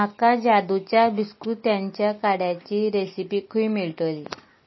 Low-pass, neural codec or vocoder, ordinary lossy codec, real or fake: 7.2 kHz; none; MP3, 24 kbps; real